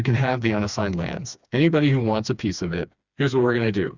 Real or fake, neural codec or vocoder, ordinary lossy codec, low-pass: fake; codec, 16 kHz, 2 kbps, FreqCodec, smaller model; Opus, 64 kbps; 7.2 kHz